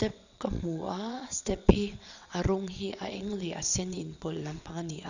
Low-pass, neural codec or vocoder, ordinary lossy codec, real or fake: 7.2 kHz; vocoder, 22.05 kHz, 80 mel bands, WaveNeXt; none; fake